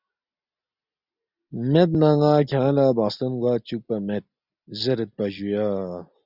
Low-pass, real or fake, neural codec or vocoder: 5.4 kHz; real; none